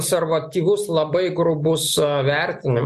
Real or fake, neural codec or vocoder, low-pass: real; none; 14.4 kHz